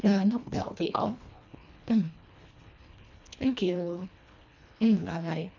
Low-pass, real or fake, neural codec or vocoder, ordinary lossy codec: 7.2 kHz; fake; codec, 24 kHz, 1.5 kbps, HILCodec; none